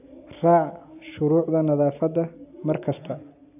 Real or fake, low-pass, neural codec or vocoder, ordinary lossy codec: real; 3.6 kHz; none; AAC, 32 kbps